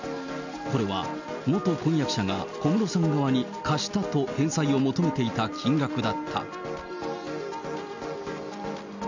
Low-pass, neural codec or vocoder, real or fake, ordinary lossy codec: 7.2 kHz; none; real; none